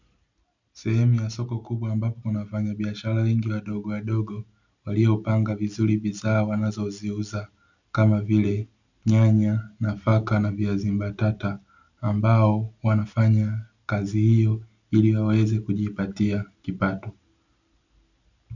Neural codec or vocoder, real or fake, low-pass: none; real; 7.2 kHz